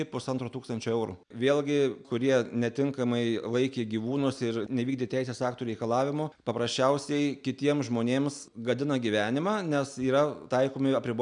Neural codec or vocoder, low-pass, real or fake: none; 9.9 kHz; real